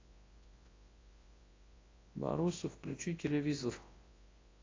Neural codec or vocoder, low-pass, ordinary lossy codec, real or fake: codec, 24 kHz, 0.9 kbps, WavTokenizer, large speech release; 7.2 kHz; AAC, 32 kbps; fake